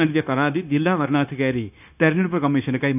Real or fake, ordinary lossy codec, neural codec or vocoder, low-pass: fake; none; codec, 16 kHz, 0.9 kbps, LongCat-Audio-Codec; 3.6 kHz